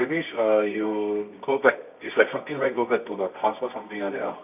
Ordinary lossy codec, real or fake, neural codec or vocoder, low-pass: none; fake; codec, 24 kHz, 0.9 kbps, WavTokenizer, medium music audio release; 3.6 kHz